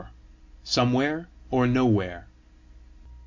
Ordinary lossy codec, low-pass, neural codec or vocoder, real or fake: MP3, 64 kbps; 7.2 kHz; none; real